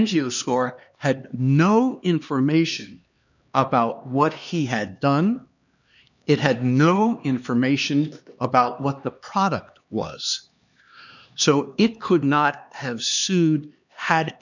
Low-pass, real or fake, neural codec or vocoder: 7.2 kHz; fake; codec, 16 kHz, 2 kbps, X-Codec, HuBERT features, trained on LibriSpeech